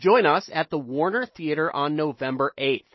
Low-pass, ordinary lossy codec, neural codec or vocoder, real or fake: 7.2 kHz; MP3, 24 kbps; none; real